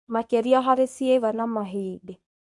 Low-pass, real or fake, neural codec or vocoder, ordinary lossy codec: 10.8 kHz; fake; codec, 24 kHz, 0.9 kbps, WavTokenizer, medium speech release version 1; none